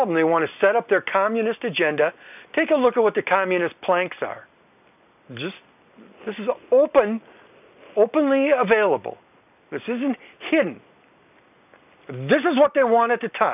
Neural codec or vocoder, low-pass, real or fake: none; 3.6 kHz; real